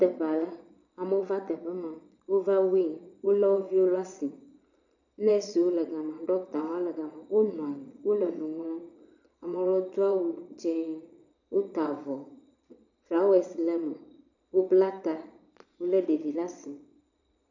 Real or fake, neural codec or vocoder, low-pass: fake; vocoder, 22.05 kHz, 80 mel bands, Vocos; 7.2 kHz